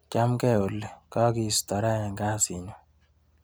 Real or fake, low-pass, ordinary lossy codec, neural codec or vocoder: real; none; none; none